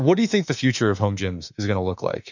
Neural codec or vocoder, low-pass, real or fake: autoencoder, 48 kHz, 32 numbers a frame, DAC-VAE, trained on Japanese speech; 7.2 kHz; fake